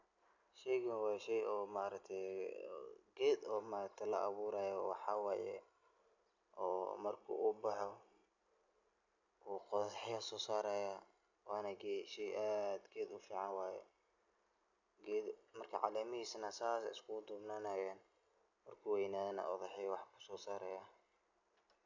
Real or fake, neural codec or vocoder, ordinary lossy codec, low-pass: real; none; none; 7.2 kHz